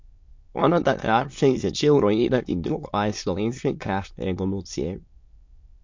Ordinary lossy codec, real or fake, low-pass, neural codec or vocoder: MP3, 48 kbps; fake; 7.2 kHz; autoencoder, 22.05 kHz, a latent of 192 numbers a frame, VITS, trained on many speakers